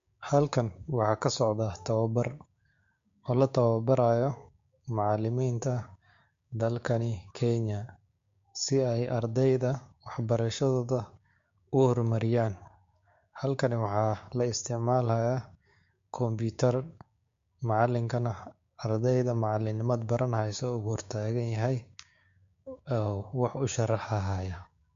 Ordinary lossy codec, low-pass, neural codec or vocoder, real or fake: MP3, 48 kbps; 7.2 kHz; codec, 16 kHz, 6 kbps, DAC; fake